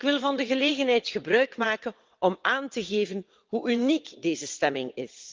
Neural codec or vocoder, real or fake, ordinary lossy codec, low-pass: vocoder, 22.05 kHz, 80 mel bands, WaveNeXt; fake; Opus, 32 kbps; 7.2 kHz